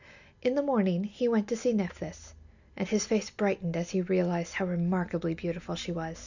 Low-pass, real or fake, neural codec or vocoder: 7.2 kHz; real; none